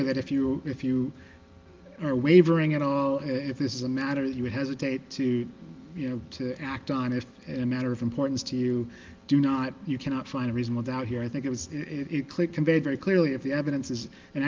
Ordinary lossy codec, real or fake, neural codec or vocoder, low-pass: Opus, 32 kbps; real; none; 7.2 kHz